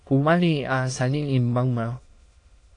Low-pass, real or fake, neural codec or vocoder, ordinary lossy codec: 9.9 kHz; fake; autoencoder, 22.05 kHz, a latent of 192 numbers a frame, VITS, trained on many speakers; AAC, 48 kbps